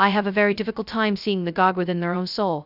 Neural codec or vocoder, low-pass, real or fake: codec, 16 kHz, 0.2 kbps, FocalCodec; 5.4 kHz; fake